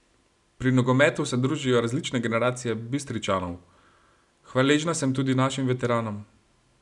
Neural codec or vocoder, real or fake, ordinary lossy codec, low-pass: none; real; none; 10.8 kHz